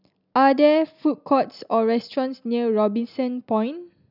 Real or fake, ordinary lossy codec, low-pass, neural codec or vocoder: real; none; 5.4 kHz; none